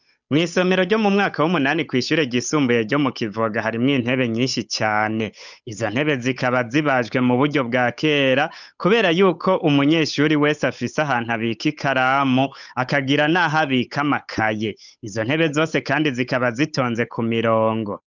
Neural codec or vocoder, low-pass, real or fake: codec, 16 kHz, 8 kbps, FunCodec, trained on Chinese and English, 25 frames a second; 7.2 kHz; fake